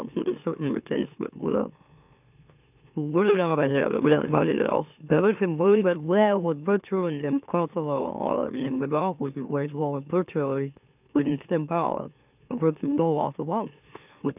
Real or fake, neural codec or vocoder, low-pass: fake; autoencoder, 44.1 kHz, a latent of 192 numbers a frame, MeloTTS; 3.6 kHz